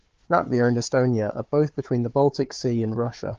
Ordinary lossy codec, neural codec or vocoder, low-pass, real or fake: Opus, 24 kbps; codec, 16 kHz, 4 kbps, FunCodec, trained on Chinese and English, 50 frames a second; 7.2 kHz; fake